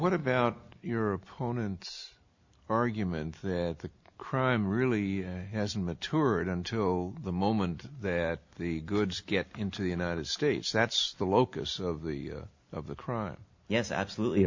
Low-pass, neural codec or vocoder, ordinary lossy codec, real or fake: 7.2 kHz; none; MP3, 48 kbps; real